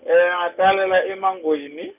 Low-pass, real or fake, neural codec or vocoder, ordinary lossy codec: 3.6 kHz; real; none; none